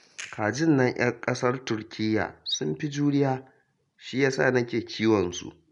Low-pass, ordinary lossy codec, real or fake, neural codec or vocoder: 10.8 kHz; none; real; none